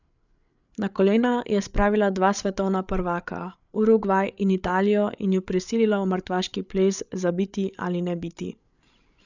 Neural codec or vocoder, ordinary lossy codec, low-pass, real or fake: codec, 16 kHz, 8 kbps, FreqCodec, larger model; none; 7.2 kHz; fake